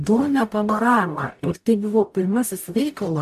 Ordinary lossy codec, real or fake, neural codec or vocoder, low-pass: AAC, 96 kbps; fake; codec, 44.1 kHz, 0.9 kbps, DAC; 14.4 kHz